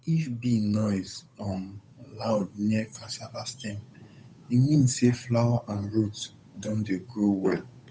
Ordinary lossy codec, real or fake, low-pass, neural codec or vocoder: none; fake; none; codec, 16 kHz, 8 kbps, FunCodec, trained on Chinese and English, 25 frames a second